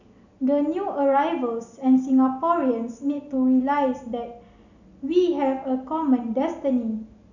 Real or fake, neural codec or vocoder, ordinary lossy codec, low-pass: real; none; none; 7.2 kHz